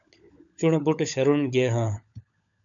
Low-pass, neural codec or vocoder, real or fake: 7.2 kHz; codec, 16 kHz, 16 kbps, FunCodec, trained on LibriTTS, 50 frames a second; fake